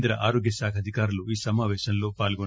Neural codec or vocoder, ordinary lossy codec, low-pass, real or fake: none; none; none; real